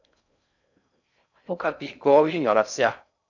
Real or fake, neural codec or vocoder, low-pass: fake; codec, 16 kHz in and 24 kHz out, 0.6 kbps, FocalCodec, streaming, 4096 codes; 7.2 kHz